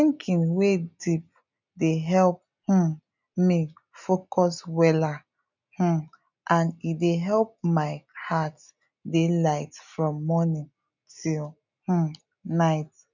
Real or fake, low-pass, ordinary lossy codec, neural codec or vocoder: real; 7.2 kHz; none; none